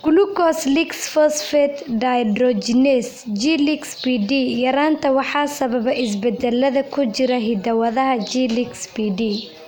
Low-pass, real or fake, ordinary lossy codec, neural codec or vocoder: none; real; none; none